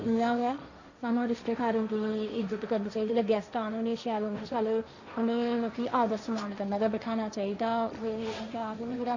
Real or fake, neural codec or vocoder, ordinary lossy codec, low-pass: fake; codec, 16 kHz, 1.1 kbps, Voila-Tokenizer; none; 7.2 kHz